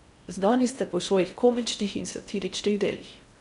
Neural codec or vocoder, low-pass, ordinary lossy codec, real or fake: codec, 16 kHz in and 24 kHz out, 0.6 kbps, FocalCodec, streaming, 4096 codes; 10.8 kHz; none; fake